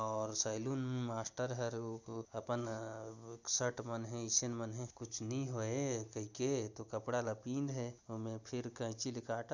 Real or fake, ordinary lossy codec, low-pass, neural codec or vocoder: real; none; 7.2 kHz; none